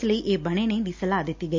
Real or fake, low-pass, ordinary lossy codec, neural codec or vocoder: real; 7.2 kHz; MP3, 64 kbps; none